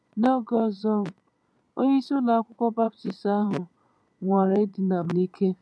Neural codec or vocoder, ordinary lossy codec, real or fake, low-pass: vocoder, 22.05 kHz, 80 mel bands, Vocos; none; fake; none